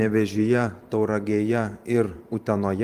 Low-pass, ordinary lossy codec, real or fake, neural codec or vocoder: 14.4 kHz; Opus, 32 kbps; fake; vocoder, 44.1 kHz, 128 mel bands every 512 samples, BigVGAN v2